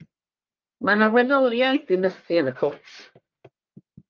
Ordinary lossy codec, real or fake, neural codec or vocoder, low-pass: Opus, 24 kbps; fake; codec, 44.1 kHz, 1.7 kbps, Pupu-Codec; 7.2 kHz